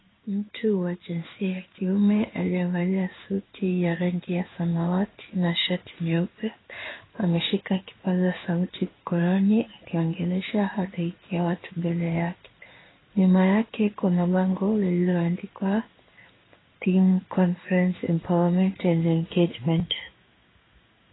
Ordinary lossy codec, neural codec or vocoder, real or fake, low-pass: AAC, 16 kbps; codec, 16 kHz in and 24 kHz out, 1 kbps, XY-Tokenizer; fake; 7.2 kHz